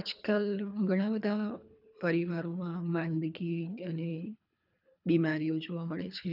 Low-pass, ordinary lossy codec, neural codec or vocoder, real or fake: 5.4 kHz; none; codec, 24 kHz, 3 kbps, HILCodec; fake